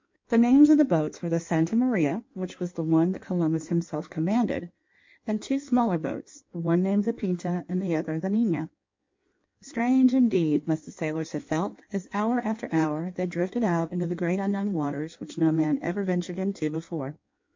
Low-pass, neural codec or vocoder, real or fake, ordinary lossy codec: 7.2 kHz; codec, 16 kHz in and 24 kHz out, 1.1 kbps, FireRedTTS-2 codec; fake; MP3, 48 kbps